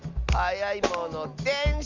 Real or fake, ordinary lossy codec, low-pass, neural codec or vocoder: real; Opus, 32 kbps; 7.2 kHz; none